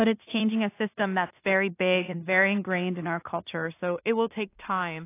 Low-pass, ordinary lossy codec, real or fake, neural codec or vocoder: 3.6 kHz; AAC, 24 kbps; fake; codec, 16 kHz in and 24 kHz out, 0.4 kbps, LongCat-Audio-Codec, two codebook decoder